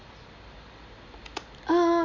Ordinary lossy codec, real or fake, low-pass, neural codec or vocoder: none; real; 7.2 kHz; none